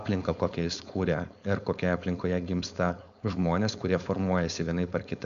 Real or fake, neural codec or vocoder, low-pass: fake; codec, 16 kHz, 4.8 kbps, FACodec; 7.2 kHz